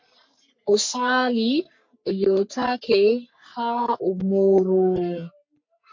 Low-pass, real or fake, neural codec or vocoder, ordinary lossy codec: 7.2 kHz; fake; codec, 44.1 kHz, 3.4 kbps, Pupu-Codec; MP3, 48 kbps